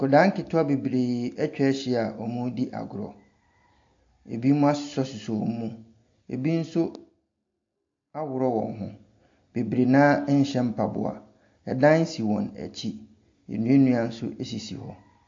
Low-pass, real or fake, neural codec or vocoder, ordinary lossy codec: 7.2 kHz; real; none; AAC, 48 kbps